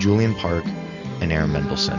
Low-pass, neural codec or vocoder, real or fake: 7.2 kHz; none; real